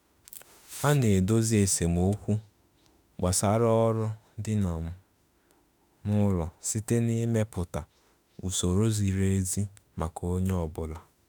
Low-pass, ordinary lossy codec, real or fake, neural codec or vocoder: none; none; fake; autoencoder, 48 kHz, 32 numbers a frame, DAC-VAE, trained on Japanese speech